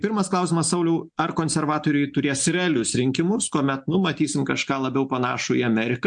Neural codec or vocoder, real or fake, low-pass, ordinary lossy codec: none; real; 9.9 kHz; MP3, 64 kbps